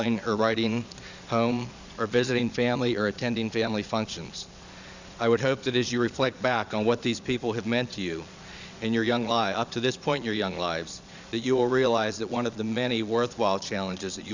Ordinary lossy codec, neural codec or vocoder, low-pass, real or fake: Opus, 64 kbps; vocoder, 22.05 kHz, 80 mel bands, Vocos; 7.2 kHz; fake